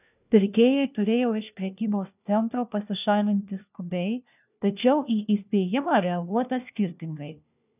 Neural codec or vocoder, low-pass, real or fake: codec, 16 kHz, 1 kbps, FunCodec, trained on LibriTTS, 50 frames a second; 3.6 kHz; fake